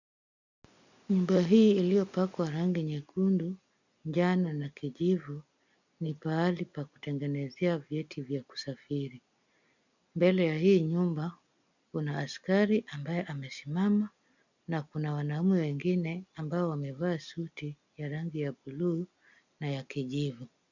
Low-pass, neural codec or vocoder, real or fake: 7.2 kHz; none; real